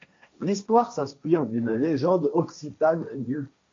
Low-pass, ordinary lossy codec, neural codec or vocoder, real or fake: 7.2 kHz; MP3, 48 kbps; codec, 16 kHz, 1.1 kbps, Voila-Tokenizer; fake